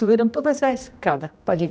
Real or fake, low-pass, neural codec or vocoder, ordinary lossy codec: fake; none; codec, 16 kHz, 1 kbps, X-Codec, HuBERT features, trained on general audio; none